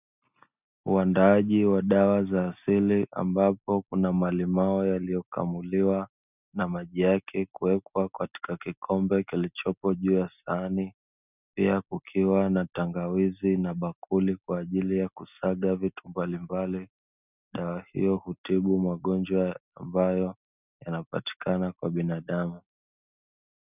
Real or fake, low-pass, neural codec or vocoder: real; 3.6 kHz; none